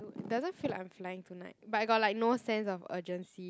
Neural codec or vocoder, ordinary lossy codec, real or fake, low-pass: none; none; real; none